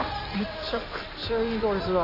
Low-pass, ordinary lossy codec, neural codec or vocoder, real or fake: 5.4 kHz; none; codec, 16 kHz in and 24 kHz out, 2.2 kbps, FireRedTTS-2 codec; fake